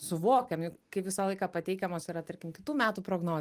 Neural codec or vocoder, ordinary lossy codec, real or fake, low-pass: none; Opus, 32 kbps; real; 14.4 kHz